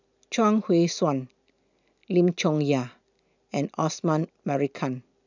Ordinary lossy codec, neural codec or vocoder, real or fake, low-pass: none; none; real; 7.2 kHz